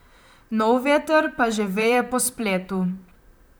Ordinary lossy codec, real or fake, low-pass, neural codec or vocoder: none; fake; none; vocoder, 44.1 kHz, 128 mel bands, Pupu-Vocoder